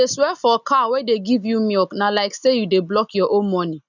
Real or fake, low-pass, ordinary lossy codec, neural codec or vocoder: real; 7.2 kHz; none; none